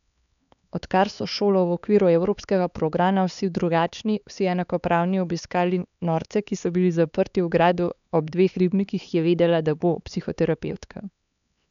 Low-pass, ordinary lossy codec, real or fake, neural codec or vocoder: 7.2 kHz; none; fake; codec, 16 kHz, 4 kbps, X-Codec, HuBERT features, trained on LibriSpeech